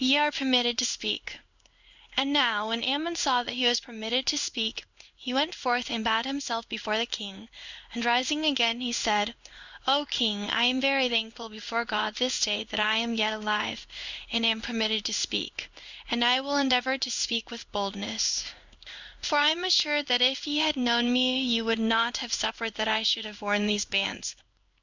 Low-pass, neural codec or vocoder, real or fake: 7.2 kHz; codec, 16 kHz in and 24 kHz out, 1 kbps, XY-Tokenizer; fake